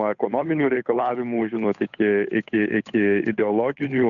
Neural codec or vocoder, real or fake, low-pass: codec, 16 kHz, 8 kbps, FunCodec, trained on Chinese and English, 25 frames a second; fake; 7.2 kHz